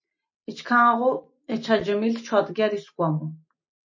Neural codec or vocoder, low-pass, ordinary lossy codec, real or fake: none; 7.2 kHz; MP3, 32 kbps; real